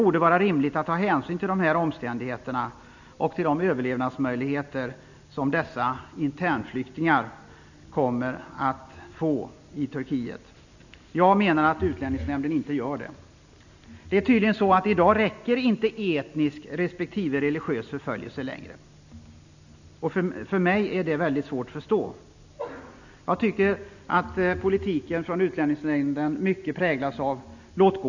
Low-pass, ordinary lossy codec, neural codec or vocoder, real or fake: 7.2 kHz; none; none; real